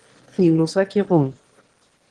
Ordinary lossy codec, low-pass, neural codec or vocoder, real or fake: Opus, 16 kbps; 9.9 kHz; autoencoder, 22.05 kHz, a latent of 192 numbers a frame, VITS, trained on one speaker; fake